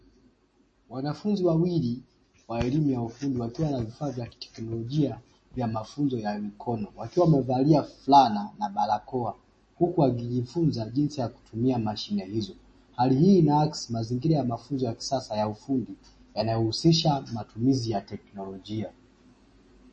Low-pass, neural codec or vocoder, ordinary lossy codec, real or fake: 9.9 kHz; none; MP3, 32 kbps; real